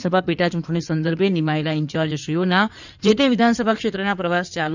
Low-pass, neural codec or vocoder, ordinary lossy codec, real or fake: 7.2 kHz; codec, 16 kHz in and 24 kHz out, 2.2 kbps, FireRedTTS-2 codec; none; fake